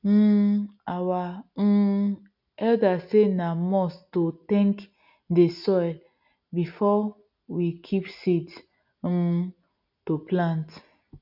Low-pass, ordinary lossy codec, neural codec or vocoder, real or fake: 5.4 kHz; none; none; real